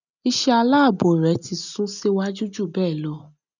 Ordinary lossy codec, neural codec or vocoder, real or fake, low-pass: none; none; real; 7.2 kHz